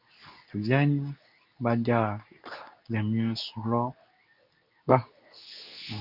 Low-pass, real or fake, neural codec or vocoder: 5.4 kHz; fake; codec, 24 kHz, 0.9 kbps, WavTokenizer, medium speech release version 2